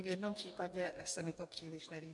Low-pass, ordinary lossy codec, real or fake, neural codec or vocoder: 10.8 kHz; MP3, 64 kbps; fake; codec, 44.1 kHz, 2.6 kbps, DAC